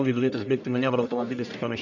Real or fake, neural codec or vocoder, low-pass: fake; codec, 44.1 kHz, 1.7 kbps, Pupu-Codec; 7.2 kHz